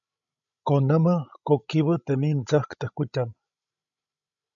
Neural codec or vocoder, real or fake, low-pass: codec, 16 kHz, 16 kbps, FreqCodec, larger model; fake; 7.2 kHz